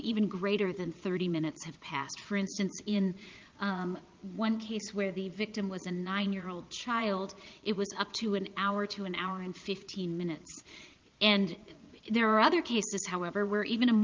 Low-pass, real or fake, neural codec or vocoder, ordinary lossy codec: 7.2 kHz; fake; vocoder, 22.05 kHz, 80 mel bands, Vocos; Opus, 24 kbps